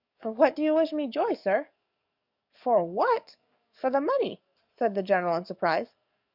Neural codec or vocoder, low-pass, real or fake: codec, 44.1 kHz, 7.8 kbps, DAC; 5.4 kHz; fake